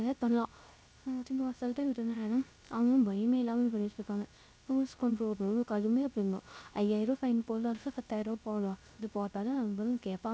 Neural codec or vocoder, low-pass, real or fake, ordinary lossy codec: codec, 16 kHz, 0.3 kbps, FocalCodec; none; fake; none